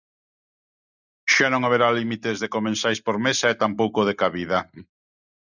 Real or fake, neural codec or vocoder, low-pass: real; none; 7.2 kHz